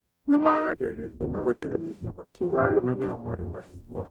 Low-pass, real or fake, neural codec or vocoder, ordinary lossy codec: 19.8 kHz; fake; codec, 44.1 kHz, 0.9 kbps, DAC; none